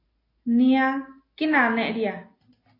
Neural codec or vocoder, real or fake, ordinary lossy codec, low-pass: none; real; AAC, 24 kbps; 5.4 kHz